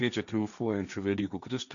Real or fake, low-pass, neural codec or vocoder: fake; 7.2 kHz; codec, 16 kHz, 1.1 kbps, Voila-Tokenizer